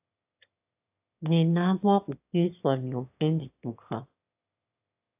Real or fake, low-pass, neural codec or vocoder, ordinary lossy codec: fake; 3.6 kHz; autoencoder, 22.05 kHz, a latent of 192 numbers a frame, VITS, trained on one speaker; AAC, 32 kbps